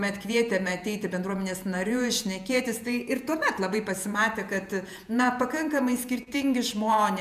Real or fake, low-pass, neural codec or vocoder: fake; 14.4 kHz; vocoder, 48 kHz, 128 mel bands, Vocos